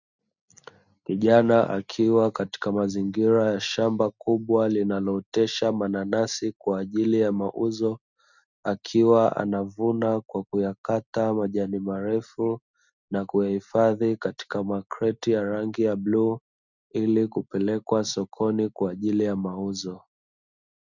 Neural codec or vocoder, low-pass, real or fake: none; 7.2 kHz; real